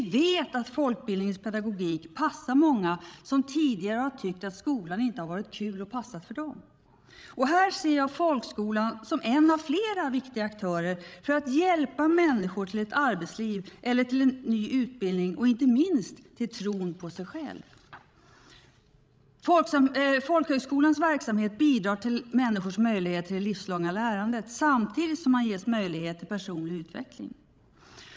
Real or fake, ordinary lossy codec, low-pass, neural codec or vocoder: fake; none; none; codec, 16 kHz, 16 kbps, FreqCodec, larger model